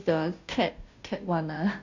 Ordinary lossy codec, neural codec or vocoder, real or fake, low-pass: none; codec, 16 kHz, 0.5 kbps, FunCodec, trained on Chinese and English, 25 frames a second; fake; 7.2 kHz